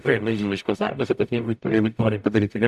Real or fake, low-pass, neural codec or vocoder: fake; 14.4 kHz; codec, 44.1 kHz, 0.9 kbps, DAC